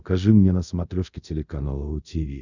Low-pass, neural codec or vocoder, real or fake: 7.2 kHz; codec, 24 kHz, 0.5 kbps, DualCodec; fake